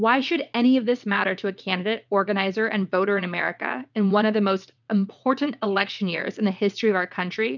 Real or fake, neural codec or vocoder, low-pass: fake; vocoder, 22.05 kHz, 80 mel bands, WaveNeXt; 7.2 kHz